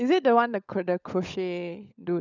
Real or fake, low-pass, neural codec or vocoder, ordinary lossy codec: fake; 7.2 kHz; codec, 16 kHz, 16 kbps, FunCodec, trained on LibriTTS, 50 frames a second; none